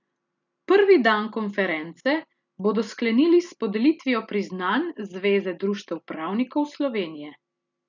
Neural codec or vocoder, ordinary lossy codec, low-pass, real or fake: none; none; 7.2 kHz; real